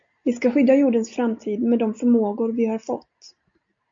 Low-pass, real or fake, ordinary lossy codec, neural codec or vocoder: 7.2 kHz; real; MP3, 48 kbps; none